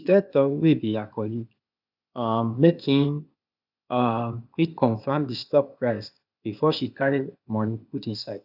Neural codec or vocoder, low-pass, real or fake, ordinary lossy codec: codec, 16 kHz, 0.8 kbps, ZipCodec; 5.4 kHz; fake; none